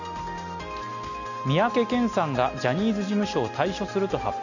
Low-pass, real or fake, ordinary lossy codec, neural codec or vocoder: 7.2 kHz; real; none; none